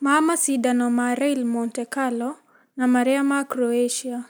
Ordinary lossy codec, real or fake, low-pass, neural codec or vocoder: none; real; none; none